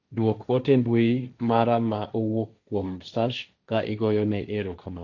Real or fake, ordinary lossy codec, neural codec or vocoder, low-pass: fake; none; codec, 16 kHz, 1.1 kbps, Voila-Tokenizer; 7.2 kHz